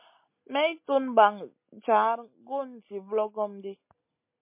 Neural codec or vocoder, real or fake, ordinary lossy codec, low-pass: none; real; MP3, 24 kbps; 3.6 kHz